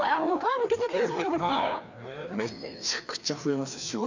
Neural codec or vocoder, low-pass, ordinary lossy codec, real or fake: codec, 16 kHz, 2 kbps, FreqCodec, larger model; 7.2 kHz; none; fake